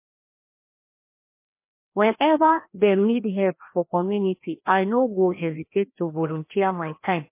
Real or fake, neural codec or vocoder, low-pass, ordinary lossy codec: fake; codec, 16 kHz, 1 kbps, FreqCodec, larger model; 3.6 kHz; MP3, 24 kbps